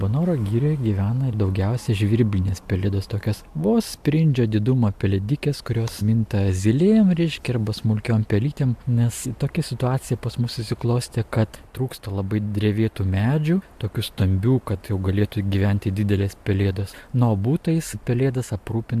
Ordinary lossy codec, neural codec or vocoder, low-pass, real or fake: AAC, 96 kbps; none; 14.4 kHz; real